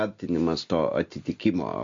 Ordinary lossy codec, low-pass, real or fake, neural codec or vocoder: MP3, 48 kbps; 7.2 kHz; real; none